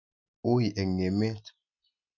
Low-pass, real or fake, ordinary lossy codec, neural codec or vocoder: 7.2 kHz; real; none; none